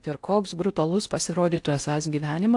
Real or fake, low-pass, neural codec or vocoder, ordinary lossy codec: fake; 10.8 kHz; codec, 16 kHz in and 24 kHz out, 0.6 kbps, FocalCodec, streaming, 4096 codes; AAC, 64 kbps